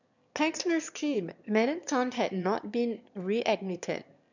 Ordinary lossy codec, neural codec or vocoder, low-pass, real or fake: none; autoencoder, 22.05 kHz, a latent of 192 numbers a frame, VITS, trained on one speaker; 7.2 kHz; fake